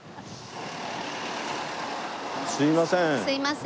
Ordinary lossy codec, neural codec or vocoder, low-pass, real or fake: none; none; none; real